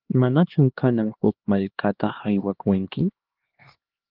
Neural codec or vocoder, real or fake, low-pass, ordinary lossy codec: codec, 16 kHz, 2 kbps, X-Codec, HuBERT features, trained on LibriSpeech; fake; 5.4 kHz; Opus, 16 kbps